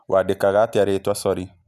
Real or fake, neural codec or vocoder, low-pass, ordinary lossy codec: real; none; 14.4 kHz; none